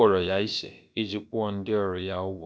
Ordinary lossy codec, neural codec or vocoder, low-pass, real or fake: none; codec, 16 kHz, about 1 kbps, DyCAST, with the encoder's durations; none; fake